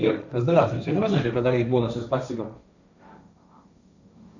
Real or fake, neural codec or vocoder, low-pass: fake; codec, 16 kHz, 1.1 kbps, Voila-Tokenizer; 7.2 kHz